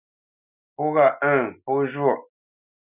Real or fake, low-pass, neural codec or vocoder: real; 3.6 kHz; none